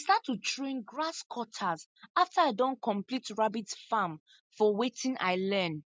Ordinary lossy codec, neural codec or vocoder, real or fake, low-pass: none; none; real; none